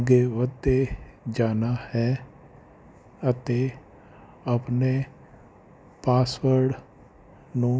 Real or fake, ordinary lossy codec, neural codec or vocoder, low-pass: real; none; none; none